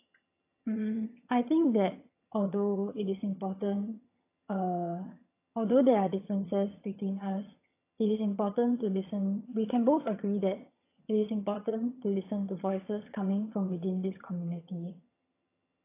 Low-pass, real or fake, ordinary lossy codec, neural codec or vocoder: 3.6 kHz; fake; AAC, 24 kbps; vocoder, 22.05 kHz, 80 mel bands, HiFi-GAN